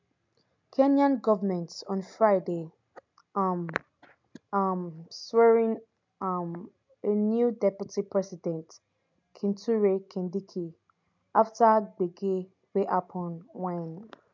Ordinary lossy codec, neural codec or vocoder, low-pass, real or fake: MP3, 64 kbps; none; 7.2 kHz; real